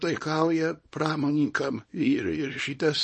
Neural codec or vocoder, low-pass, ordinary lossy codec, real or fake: codec, 24 kHz, 0.9 kbps, WavTokenizer, small release; 10.8 kHz; MP3, 32 kbps; fake